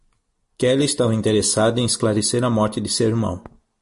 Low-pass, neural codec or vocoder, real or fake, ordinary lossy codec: 10.8 kHz; none; real; MP3, 48 kbps